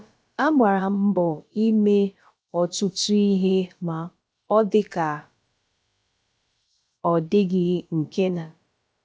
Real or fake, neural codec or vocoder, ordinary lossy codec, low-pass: fake; codec, 16 kHz, about 1 kbps, DyCAST, with the encoder's durations; none; none